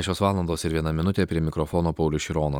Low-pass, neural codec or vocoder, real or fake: 19.8 kHz; vocoder, 44.1 kHz, 128 mel bands every 256 samples, BigVGAN v2; fake